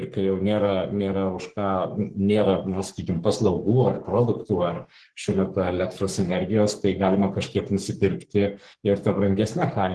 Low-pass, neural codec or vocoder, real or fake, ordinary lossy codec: 10.8 kHz; codec, 44.1 kHz, 3.4 kbps, Pupu-Codec; fake; Opus, 16 kbps